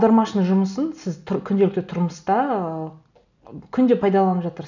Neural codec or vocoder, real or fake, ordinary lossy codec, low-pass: none; real; none; 7.2 kHz